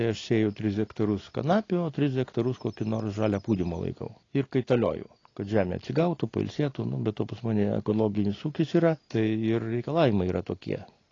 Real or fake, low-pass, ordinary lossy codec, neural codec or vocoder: real; 7.2 kHz; AAC, 32 kbps; none